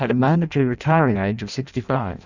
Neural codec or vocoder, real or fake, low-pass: codec, 16 kHz in and 24 kHz out, 0.6 kbps, FireRedTTS-2 codec; fake; 7.2 kHz